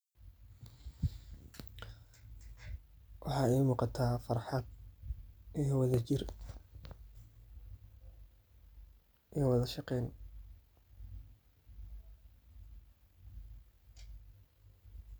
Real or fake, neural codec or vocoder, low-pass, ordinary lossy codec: real; none; none; none